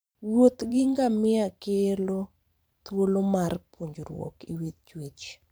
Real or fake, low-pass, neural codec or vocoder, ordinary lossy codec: fake; none; vocoder, 44.1 kHz, 128 mel bands every 512 samples, BigVGAN v2; none